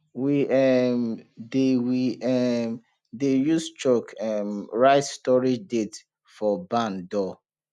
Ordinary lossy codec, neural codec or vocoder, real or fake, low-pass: none; none; real; none